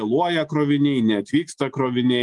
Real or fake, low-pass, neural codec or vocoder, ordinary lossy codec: real; 10.8 kHz; none; Opus, 32 kbps